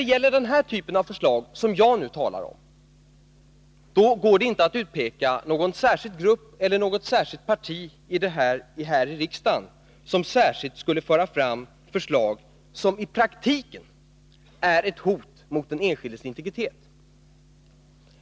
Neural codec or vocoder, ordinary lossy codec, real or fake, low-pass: none; none; real; none